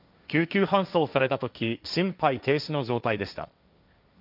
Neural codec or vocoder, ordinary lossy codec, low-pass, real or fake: codec, 16 kHz, 1.1 kbps, Voila-Tokenizer; none; 5.4 kHz; fake